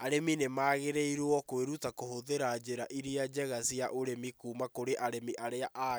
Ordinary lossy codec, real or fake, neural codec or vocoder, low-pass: none; real; none; none